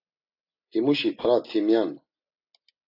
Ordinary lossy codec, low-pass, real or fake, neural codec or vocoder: AAC, 32 kbps; 5.4 kHz; real; none